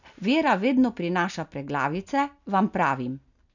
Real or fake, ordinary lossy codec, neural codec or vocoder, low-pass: real; none; none; 7.2 kHz